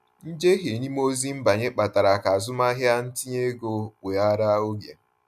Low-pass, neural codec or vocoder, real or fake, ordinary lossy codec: 14.4 kHz; none; real; none